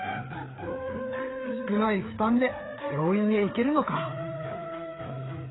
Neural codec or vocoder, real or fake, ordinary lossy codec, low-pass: codec, 16 kHz, 4 kbps, FreqCodec, larger model; fake; AAC, 16 kbps; 7.2 kHz